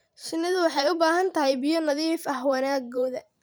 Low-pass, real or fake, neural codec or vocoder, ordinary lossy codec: none; fake; vocoder, 44.1 kHz, 128 mel bands every 512 samples, BigVGAN v2; none